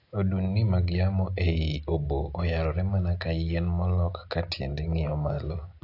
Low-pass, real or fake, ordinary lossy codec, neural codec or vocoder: 5.4 kHz; real; AAC, 32 kbps; none